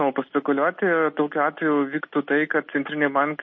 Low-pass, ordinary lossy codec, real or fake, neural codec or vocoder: 7.2 kHz; MP3, 32 kbps; real; none